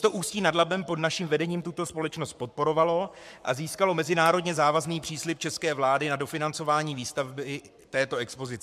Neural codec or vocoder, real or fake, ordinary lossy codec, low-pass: codec, 44.1 kHz, 7.8 kbps, Pupu-Codec; fake; MP3, 96 kbps; 14.4 kHz